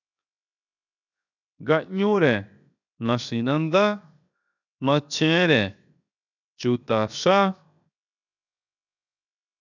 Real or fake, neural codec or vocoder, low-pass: fake; codec, 16 kHz, 0.7 kbps, FocalCodec; 7.2 kHz